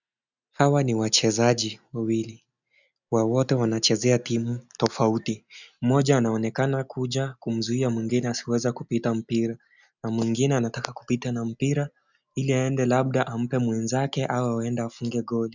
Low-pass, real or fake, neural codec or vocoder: 7.2 kHz; real; none